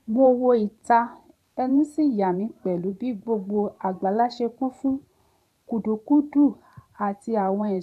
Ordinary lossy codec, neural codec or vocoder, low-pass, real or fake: none; vocoder, 44.1 kHz, 128 mel bands every 512 samples, BigVGAN v2; 14.4 kHz; fake